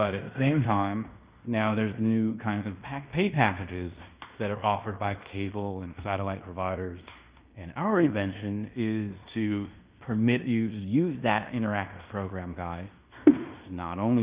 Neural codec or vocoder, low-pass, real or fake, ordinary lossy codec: codec, 16 kHz in and 24 kHz out, 0.9 kbps, LongCat-Audio-Codec, fine tuned four codebook decoder; 3.6 kHz; fake; Opus, 64 kbps